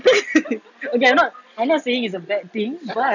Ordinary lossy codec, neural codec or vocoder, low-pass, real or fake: none; none; 7.2 kHz; real